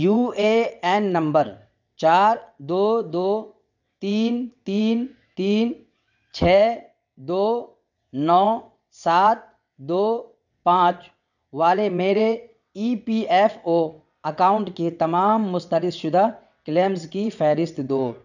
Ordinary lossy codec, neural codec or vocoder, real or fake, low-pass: none; vocoder, 22.05 kHz, 80 mel bands, WaveNeXt; fake; 7.2 kHz